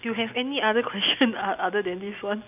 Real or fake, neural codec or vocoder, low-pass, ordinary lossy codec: real; none; 3.6 kHz; none